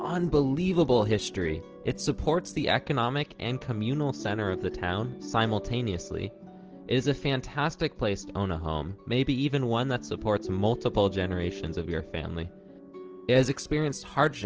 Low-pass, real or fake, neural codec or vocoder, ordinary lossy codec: 7.2 kHz; real; none; Opus, 16 kbps